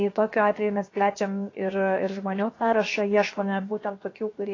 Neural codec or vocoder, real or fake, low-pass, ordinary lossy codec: codec, 16 kHz, 0.7 kbps, FocalCodec; fake; 7.2 kHz; AAC, 32 kbps